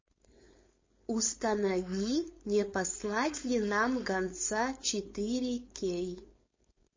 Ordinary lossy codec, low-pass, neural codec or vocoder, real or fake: MP3, 32 kbps; 7.2 kHz; codec, 16 kHz, 4.8 kbps, FACodec; fake